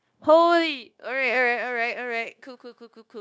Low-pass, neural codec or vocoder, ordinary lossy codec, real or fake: none; codec, 16 kHz, 0.9 kbps, LongCat-Audio-Codec; none; fake